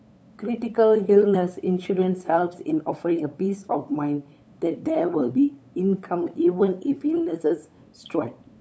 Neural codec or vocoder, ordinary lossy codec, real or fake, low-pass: codec, 16 kHz, 8 kbps, FunCodec, trained on LibriTTS, 25 frames a second; none; fake; none